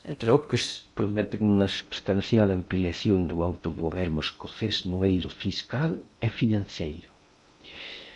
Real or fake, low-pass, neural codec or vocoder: fake; 10.8 kHz; codec, 16 kHz in and 24 kHz out, 0.6 kbps, FocalCodec, streaming, 2048 codes